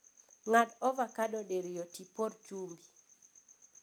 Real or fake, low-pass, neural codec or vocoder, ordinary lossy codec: real; none; none; none